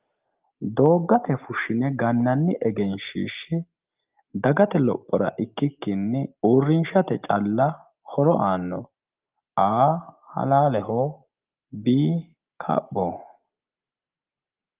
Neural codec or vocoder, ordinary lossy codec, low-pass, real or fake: none; Opus, 32 kbps; 3.6 kHz; real